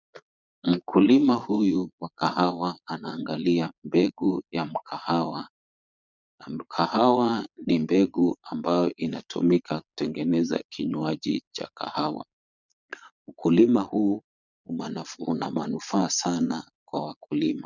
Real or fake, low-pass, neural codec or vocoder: fake; 7.2 kHz; vocoder, 22.05 kHz, 80 mel bands, Vocos